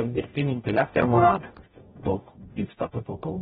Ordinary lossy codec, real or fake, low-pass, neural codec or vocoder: AAC, 16 kbps; fake; 19.8 kHz; codec, 44.1 kHz, 0.9 kbps, DAC